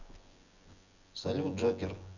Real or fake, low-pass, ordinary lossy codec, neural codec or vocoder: fake; 7.2 kHz; none; vocoder, 24 kHz, 100 mel bands, Vocos